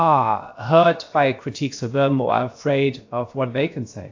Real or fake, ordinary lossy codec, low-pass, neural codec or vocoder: fake; AAC, 48 kbps; 7.2 kHz; codec, 16 kHz, about 1 kbps, DyCAST, with the encoder's durations